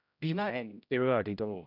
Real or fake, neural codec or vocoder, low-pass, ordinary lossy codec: fake; codec, 16 kHz, 0.5 kbps, X-Codec, HuBERT features, trained on general audio; 5.4 kHz; none